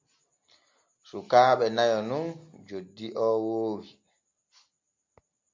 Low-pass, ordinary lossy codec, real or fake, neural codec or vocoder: 7.2 kHz; MP3, 48 kbps; real; none